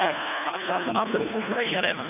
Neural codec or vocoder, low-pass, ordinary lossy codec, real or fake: codec, 24 kHz, 1.5 kbps, HILCodec; 3.6 kHz; none; fake